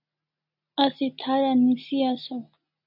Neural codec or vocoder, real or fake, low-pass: none; real; 5.4 kHz